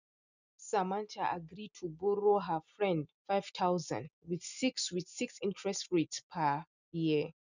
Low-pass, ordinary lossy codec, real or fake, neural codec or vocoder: 7.2 kHz; none; real; none